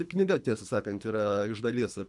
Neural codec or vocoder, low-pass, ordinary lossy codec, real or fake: codec, 24 kHz, 3 kbps, HILCodec; 10.8 kHz; MP3, 96 kbps; fake